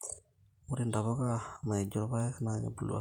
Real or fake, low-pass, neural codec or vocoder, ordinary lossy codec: fake; 19.8 kHz; vocoder, 48 kHz, 128 mel bands, Vocos; none